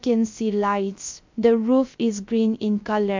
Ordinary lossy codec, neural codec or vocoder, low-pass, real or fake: MP3, 64 kbps; codec, 16 kHz, 0.3 kbps, FocalCodec; 7.2 kHz; fake